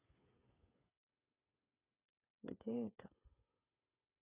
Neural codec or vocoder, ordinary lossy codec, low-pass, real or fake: codec, 16 kHz, 8 kbps, FreqCodec, smaller model; none; 3.6 kHz; fake